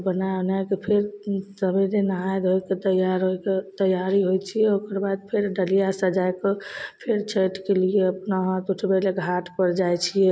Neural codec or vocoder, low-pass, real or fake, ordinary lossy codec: none; none; real; none